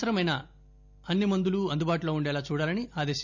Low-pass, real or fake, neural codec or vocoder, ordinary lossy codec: 7.2 kHz; real; none; none